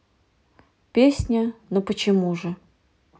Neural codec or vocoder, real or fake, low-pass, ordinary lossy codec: none; real; none; none